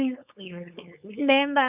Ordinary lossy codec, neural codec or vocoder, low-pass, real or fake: none; codec, 16 kHz, 4.8 kbps, FACodec; 3.6 kHz; fake